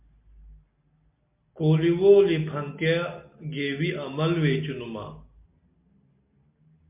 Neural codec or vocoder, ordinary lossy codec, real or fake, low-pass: none; MP3, 32 kbps; real; 3.6 kHz